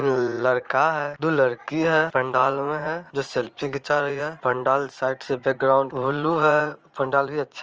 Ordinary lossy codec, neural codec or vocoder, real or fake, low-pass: Opus, 24 kbps; vocoder, 44.1 kHz, 80 mel bands, Vocos; fake; 7.2 kHz